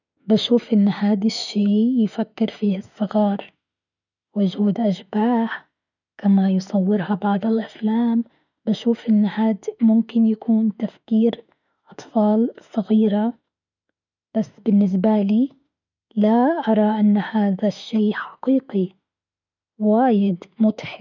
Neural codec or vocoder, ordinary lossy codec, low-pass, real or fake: autoencoder, 48 kHz, 32 numbers a frame, DAC-VAE, trained on Japanese speech; none; 7.2 kHz; fake